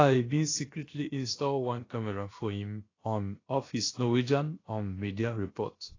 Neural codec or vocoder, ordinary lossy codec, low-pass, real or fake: codec, 16 kHz, about 1 kbps, DyCAST, with the encoder's durations; AAC, 32 kbps; 7.2 kHz; fake